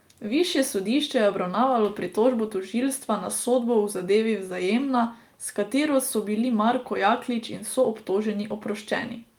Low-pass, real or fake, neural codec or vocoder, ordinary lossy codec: 19.8 kHz; real; none; Opus, 32 kbps